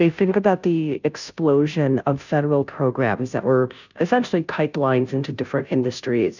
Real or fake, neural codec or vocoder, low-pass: fake; codec, 16 kHz, 0.5 kbps, FunCodec, trained on Chinese and English, 25 frames a second; 7.2 kHz